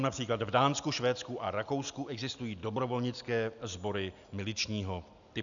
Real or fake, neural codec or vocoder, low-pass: real; none; 7.2 kHz